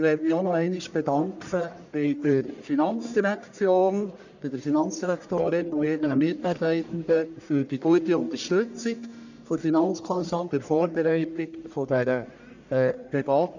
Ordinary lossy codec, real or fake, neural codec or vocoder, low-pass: none; fake; codec, 44.1 kHz, 1.7 kbps, Pupu-Codec; 7.2 kHz